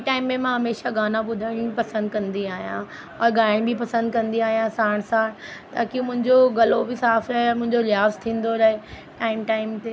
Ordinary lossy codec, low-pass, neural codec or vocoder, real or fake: none; none; none; real